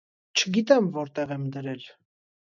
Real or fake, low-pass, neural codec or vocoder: real; 7.2 kHz; none